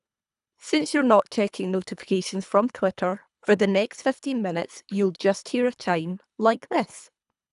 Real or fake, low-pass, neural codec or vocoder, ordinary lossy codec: fake; 10.8 kHz; codec, 24 kHz, 3 kbps, HILCodec; none